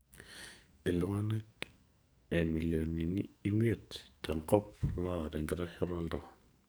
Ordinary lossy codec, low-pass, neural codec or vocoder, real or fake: none; none; codec, 44.1 kHz, 2.6 kbps, SNAC; fake